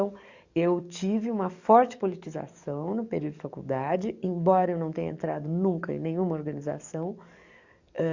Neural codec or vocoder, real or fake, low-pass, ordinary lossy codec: codec, 44.1 kHz, 7.8 kbps, DAC; fake; 7.2 kHz; Opus, 64 kbps